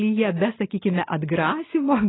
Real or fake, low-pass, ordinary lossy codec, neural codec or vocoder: real; 7.2 kHz; AAC, 16 kbps; none